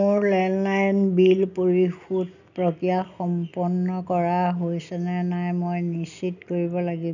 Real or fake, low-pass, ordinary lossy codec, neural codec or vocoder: real; 7.2 kHz; none; none